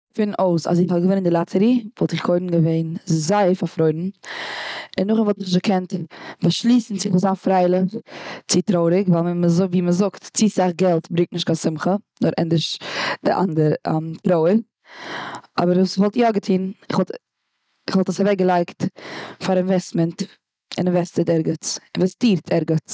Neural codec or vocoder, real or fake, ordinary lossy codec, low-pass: none; real; none; none